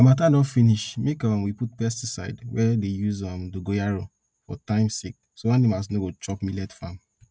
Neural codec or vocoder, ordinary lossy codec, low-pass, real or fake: none; none; none; real